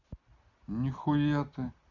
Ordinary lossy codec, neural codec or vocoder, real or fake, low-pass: none; none; real; 7.2 kHz